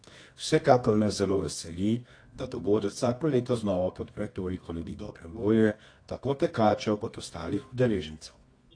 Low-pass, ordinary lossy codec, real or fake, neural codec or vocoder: 9.9 kHz; AAC, 48 kbps; fake; codec, 24 kHz, 0.9 kbps, WavTokenizer, medium music audio release